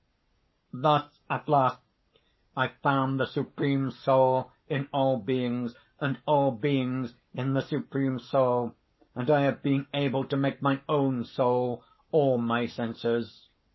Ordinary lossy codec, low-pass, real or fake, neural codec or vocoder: MP3, 24 kbps; 7.2 kHz; fake; codec, 44.1 kHz, 7.8 kbps, Pupu-Codec